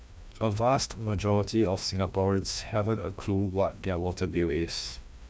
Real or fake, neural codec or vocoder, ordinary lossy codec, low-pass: fake; codec, 16 kHz, 1 kbps, FreqCodec, larger model; none; none